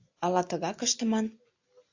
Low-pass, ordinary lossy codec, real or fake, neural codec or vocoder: 7.2 kHz; AAC, 48 kbps; real; none